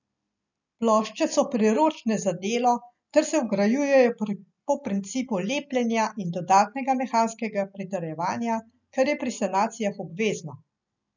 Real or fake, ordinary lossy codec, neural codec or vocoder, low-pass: real; none; none; 7.2 kHz